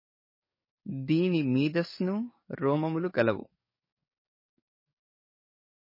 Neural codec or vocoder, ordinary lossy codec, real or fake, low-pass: codec, 44.1 kHz, 7.8 kbps, DAC; MP3, 24 kbps; fake; 5.4 kHz